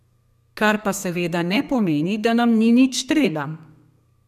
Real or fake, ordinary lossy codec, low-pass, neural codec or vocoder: fake; none; 14.4 kHz; codec, 32 kHz, 1.9 kbps, SNAC